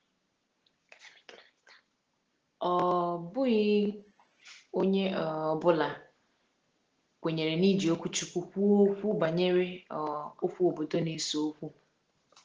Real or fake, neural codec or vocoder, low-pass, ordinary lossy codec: real; none; 7.2 kHz; Opus, 16 kbps